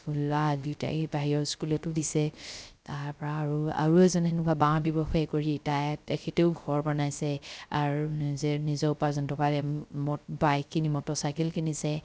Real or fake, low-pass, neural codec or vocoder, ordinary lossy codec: fake; none; codec, 16 kHz, 0.3 kbps, FocalCodec; none